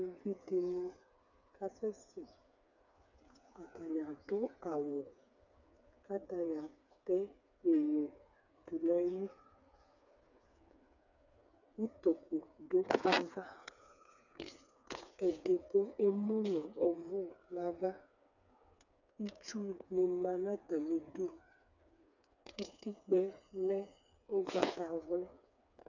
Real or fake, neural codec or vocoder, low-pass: fake; codec, 16 kHz, 4 kbps, FreqCodec, smaller model; 7.2 kHz